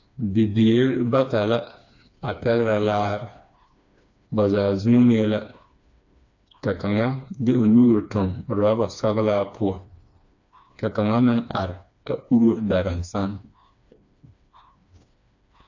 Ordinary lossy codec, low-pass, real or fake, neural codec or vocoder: AAC, 48 kbps; 7.2 kHz; fake; codec, 16 kHz, 2 kbps, FreqCodec, smaller model